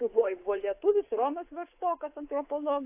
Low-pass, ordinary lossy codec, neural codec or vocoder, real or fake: 3.6 kHz; AAC, 24 kbps; codec, 24 kHz, 3.1 kbps, DualCodec; fake